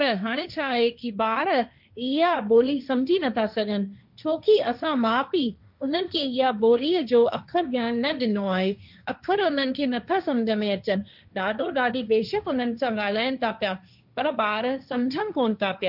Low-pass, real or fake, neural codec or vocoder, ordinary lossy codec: 5.4 kHz; fake; codec, 16 kHz, 1.1 kbps, Voila-Tokenizer; none